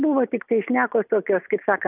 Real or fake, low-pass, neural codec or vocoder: real; 3.6 kHz; none